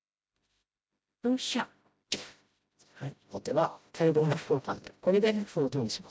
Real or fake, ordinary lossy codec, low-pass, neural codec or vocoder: fake; none; none; codec, 16 kHz, 0.5 kbps, FreqCodec, smaller model